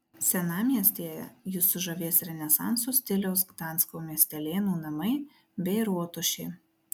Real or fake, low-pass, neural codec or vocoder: real; 19.8 kHz; none